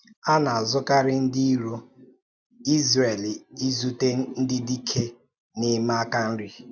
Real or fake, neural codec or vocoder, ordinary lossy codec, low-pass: real; none; none; none